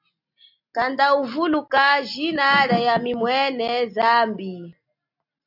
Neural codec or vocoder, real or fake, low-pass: none; real; 5.4 kHz